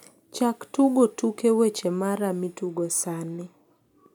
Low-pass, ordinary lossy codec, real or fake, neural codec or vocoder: none; none; real; none